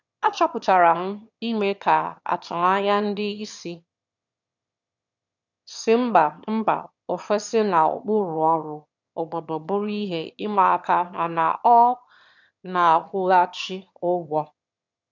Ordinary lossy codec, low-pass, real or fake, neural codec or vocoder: none; 7.2 kHz; fake; autoencoder, 22.05 kHz, a latent of 192 numbers a frame, VITS, trained on one speaker